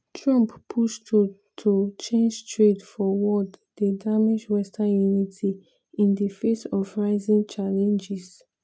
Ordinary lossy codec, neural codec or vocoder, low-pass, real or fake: none; none; none; real